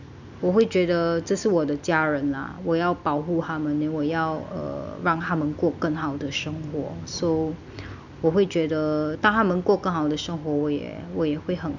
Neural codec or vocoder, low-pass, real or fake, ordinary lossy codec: none; 7.2 kHz; real; none